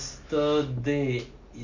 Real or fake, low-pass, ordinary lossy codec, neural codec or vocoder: real; 7.2 kHz; none; none